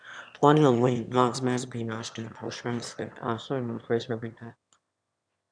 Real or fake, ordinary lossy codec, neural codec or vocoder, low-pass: fake; none; autoencoder, 22.05 kHz, a latent of 192 numbers a frame, VITS, trained on one speaker; 9.9 kHz